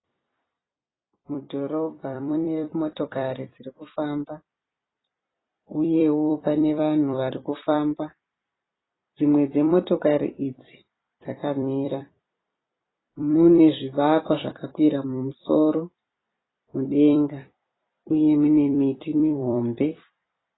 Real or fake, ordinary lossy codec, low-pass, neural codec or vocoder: fake; AAC, 16 kbps; 7.2 kHz; vocoder, 44.1 kHz, 128 mel bands every 256 samples, BigVGAN v2